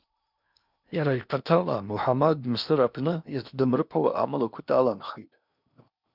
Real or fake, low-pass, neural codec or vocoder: fake; 5.4 kHz; codec, 16 kHz in and 24 kHz out, 0.8 kbps, FocalCodec, streaming, 65536 codes